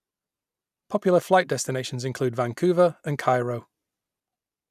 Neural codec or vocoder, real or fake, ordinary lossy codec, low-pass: none; real; none; 14.4 kHz